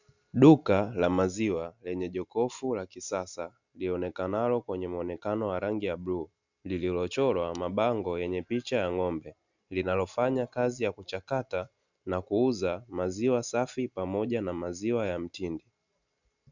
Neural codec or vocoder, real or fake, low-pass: none; real; 7.2 kHz